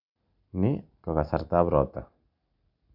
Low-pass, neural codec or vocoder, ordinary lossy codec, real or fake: 5.4 kHz; none; none; real